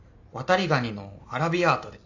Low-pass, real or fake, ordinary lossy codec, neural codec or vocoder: 7.2 kHz; real; AAC, 48 kbps; none